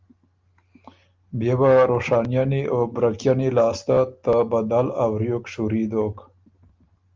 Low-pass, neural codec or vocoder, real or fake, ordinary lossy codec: 7.2 kHz; none; real; Opus, 32 kbps